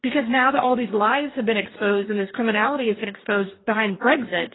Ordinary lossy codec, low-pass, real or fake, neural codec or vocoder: AAC, 16 kbps; 7.2 kHz; fake; codec, 44.1 kHz, 2.6 kbps, DAC